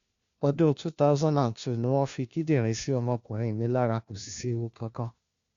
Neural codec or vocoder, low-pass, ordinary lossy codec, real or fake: codec, 16 kHz, 1 kbps, FunCodec, trained on LibriTTS, 50 frames a second; 7.2 kHz; Opus, 64 kbps; fake